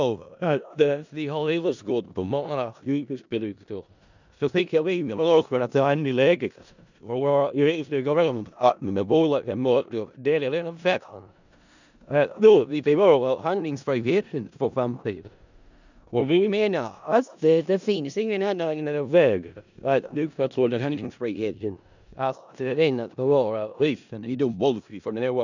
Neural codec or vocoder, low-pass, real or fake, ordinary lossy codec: codec, 16 kHz in and 24 kHz out, 0.4 kbps, LongCat-Audio-Codec, four codebook decoder; 7.2 kHz; fake; none